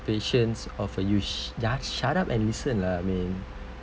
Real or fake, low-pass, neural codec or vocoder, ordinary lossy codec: real; none; none; none